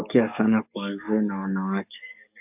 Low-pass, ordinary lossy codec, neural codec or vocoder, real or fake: 3.6 kHz; AAC, 32 kbps; codec, 44.1 kHz, 7.8 kbps, DAC; fake